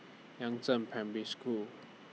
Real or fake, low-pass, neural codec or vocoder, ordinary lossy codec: real; none; none; none